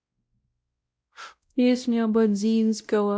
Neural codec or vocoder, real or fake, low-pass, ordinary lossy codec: codec, 16 kHz, 0.5 kbps, X-Codec, WavLM features, trained on Multilingual LibriSpeech; fake; none; none